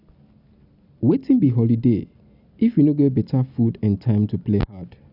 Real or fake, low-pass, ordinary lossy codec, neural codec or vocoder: real; 5.4 kHz; none; none